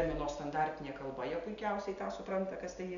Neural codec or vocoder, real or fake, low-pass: none; real; 7.2 kHz